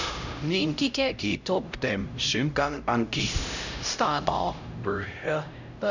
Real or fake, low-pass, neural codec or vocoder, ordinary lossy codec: fake; 7.2 kHz; codec, 16 kHz, 0.5 kbps, X-Codec, HuBERT features, trained on LibriSpeech; none